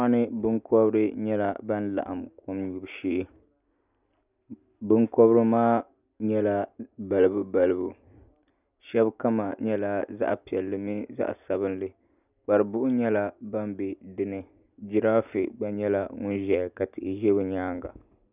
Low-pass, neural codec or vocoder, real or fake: 3.6 kHz; none; real